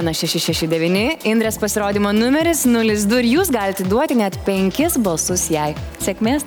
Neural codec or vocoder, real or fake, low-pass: none; real; 19.8 kHz